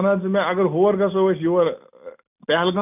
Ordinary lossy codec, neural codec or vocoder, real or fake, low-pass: none; none; real; 3.6 kHz